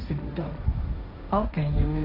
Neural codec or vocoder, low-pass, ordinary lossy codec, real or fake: codec, 16 kHz, 1.1 kbps, Voila-Tokenizer; 5.4 kHz; none; fake